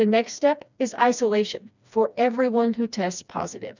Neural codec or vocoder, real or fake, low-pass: codec, 16 kHz, 2 kbps, FreqCodec, smaller model; fake; 7.2 kHz